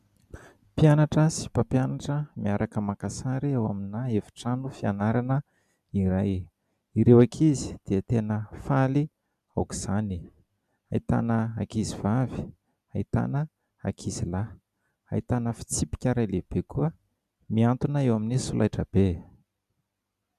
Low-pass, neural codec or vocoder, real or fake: 14.4 kHz; none; real